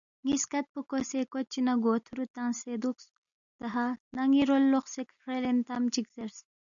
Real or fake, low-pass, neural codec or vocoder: real; 7.2 kHz; none